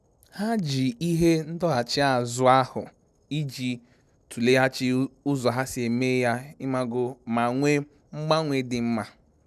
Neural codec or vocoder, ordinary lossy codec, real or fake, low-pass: none; none; real; 14.4 kHz